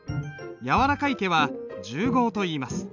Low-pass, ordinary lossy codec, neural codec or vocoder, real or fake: 7.2 kHz; none; none; real